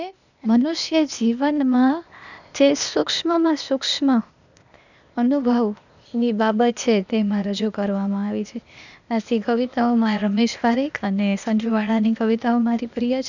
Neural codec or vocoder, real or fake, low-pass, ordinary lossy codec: codec, 16 kHz, 0.8 kbps, ZipCodec; fake; 7.2 kHz; none